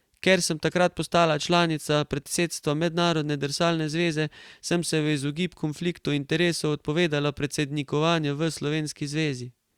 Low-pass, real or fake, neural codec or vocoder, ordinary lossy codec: 19.8 kHz; real; none; Opus, 64 kbps